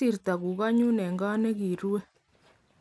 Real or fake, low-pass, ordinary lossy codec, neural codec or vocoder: real; none; none; none